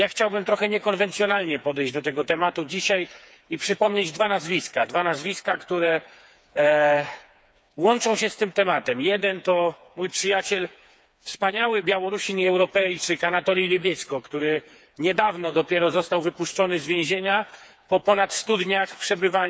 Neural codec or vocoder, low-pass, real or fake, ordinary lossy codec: codec, 16 kHz, 4 kbps, FreqCodec, smaller model; none; fake; none